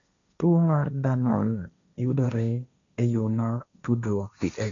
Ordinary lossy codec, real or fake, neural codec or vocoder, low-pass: none; fake; codec, 16 kHz, 1.1 kbps, Voila-Tokenizer; 7.2 kHz